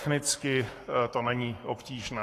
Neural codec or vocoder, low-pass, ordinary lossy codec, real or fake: codec, 44.1 kHz, 7.8 kbps, Pupu-Codec; 14.4 kHz; AAC, 48 kbps; fake